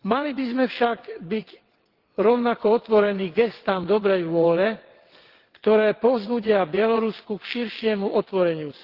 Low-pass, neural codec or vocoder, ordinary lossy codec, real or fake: 5.4 kHz; vocoder, 22.05 kHz, 80 mel bands, WaveNeXt; Opus, 16 kbps; fake